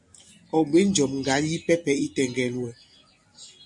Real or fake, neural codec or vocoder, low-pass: real; none; 10.8 kHz